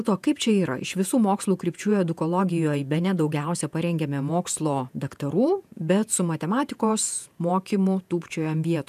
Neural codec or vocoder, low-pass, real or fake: vocoder, 48 kHz, 128 mel bands, Vocos; 14.4 kHz; fake